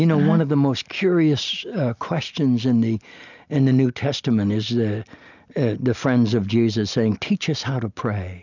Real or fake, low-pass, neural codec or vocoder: real; 7.2 kHz; none